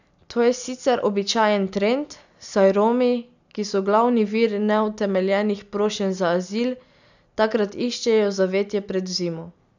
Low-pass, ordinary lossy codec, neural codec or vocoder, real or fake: 7.2 kHz; none; none; real